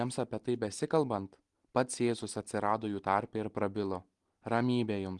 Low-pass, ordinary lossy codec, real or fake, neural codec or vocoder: 10.8 kHz; Opus, 24 kbps; real; none